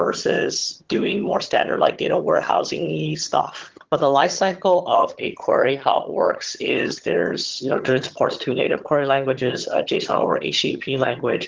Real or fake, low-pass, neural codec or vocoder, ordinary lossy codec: fake; 7.2 kHz; vocoder, 22.05 kHz, 80 mel bands, HiFi-GAN; Opus, 16 kbps